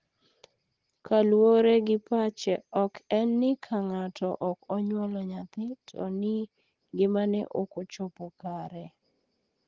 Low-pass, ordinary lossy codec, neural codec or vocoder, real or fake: 7.2 kHz; Opus, 16 kbps; none; real